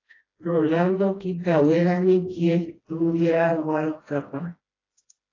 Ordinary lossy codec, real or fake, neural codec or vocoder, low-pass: AAC, 32 kbps; fake; codec, 16 kHz, 1 kbps, FreqCodec, smaller model; 7.2 kHz